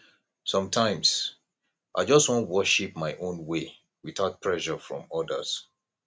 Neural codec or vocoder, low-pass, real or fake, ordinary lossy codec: none; none; real; none